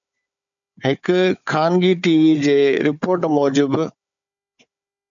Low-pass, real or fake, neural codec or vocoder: 7.2 kHz; fake; codec, 16 kHz, 4 kbps, FunCodec, trained on Chinese and English, 50 frames a second